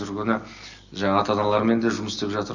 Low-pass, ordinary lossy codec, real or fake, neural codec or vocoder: 7.2 kHz; none; real; none